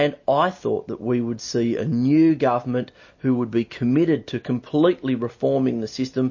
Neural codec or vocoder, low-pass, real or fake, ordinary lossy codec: none; 7.2 kHz; real; MP3, 32 kbps